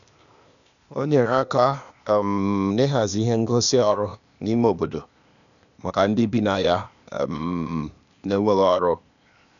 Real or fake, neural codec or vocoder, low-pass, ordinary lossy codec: fake; codec, 16 kHz, 0.8 kbps, ZipCodec; 7.2 kHz; none